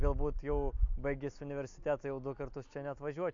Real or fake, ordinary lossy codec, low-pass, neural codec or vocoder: real; AAC, 64 kbps; 7.2 kHz; none